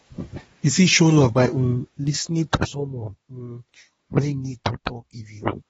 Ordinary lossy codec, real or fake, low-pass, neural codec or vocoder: AAC, 24 kbps; fake; 10.8 kHz; codec, 24 kHz, 1 kbps, SNAC